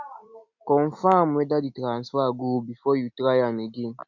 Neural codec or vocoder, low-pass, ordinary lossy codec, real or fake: none; 7.2 kHz; none; real